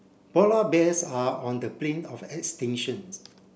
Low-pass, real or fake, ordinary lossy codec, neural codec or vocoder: none; real; none; none